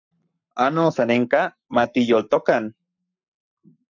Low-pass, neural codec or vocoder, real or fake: 7.2 kHz; codec, 44.1 kHz, 7.8 kbps, Pupu-Codec; fake